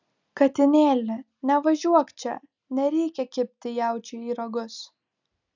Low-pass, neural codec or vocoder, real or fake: 7.2 kHz; none; real